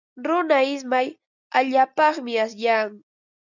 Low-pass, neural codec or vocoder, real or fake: 7.2 kHz; none; real